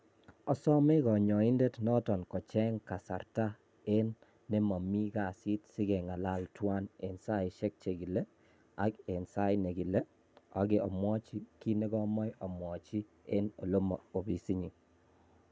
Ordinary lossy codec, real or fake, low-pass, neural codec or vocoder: none; real; none; none